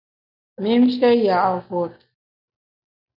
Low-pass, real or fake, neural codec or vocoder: 5.4 kHz; real; none